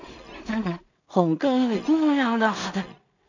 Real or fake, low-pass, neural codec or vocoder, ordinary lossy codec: fake; 7.2 kHz; codec, 16 kHz in and 24 kHz out, 0.4 kbps, LongCat-Audio-Codec, two codebook decoder; none